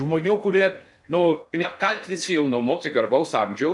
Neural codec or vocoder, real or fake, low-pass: codec, 16 kHz in and 24 kHz out, 0.6 kbps, FocalCodec, streaming, 2048 codes; fake; 10.8 kHz